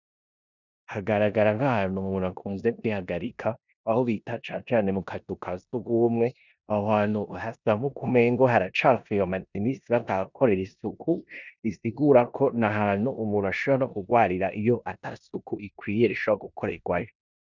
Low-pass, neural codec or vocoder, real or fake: 7.2 kHz; codec, 16 kHz in and 24 kHz out, 0.9 kbps, LongCat-Audio-Codec, four codebook decoder; fake